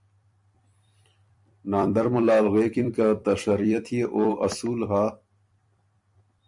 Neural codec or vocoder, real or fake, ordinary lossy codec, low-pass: vocoder, 44.1 kHz, 128 mel bands every 256 samples, BigVGAN v2; fake; MP3, 64 kbps; 10.8 kHz